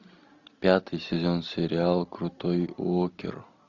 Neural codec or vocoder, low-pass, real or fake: none; 7.2 kHz; real